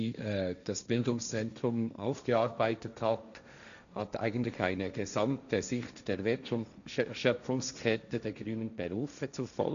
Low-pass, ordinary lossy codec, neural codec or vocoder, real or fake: 7.2 kHz; none; codec, 16 kHz, 1.1 kbps, Voila-Tokenizer; fake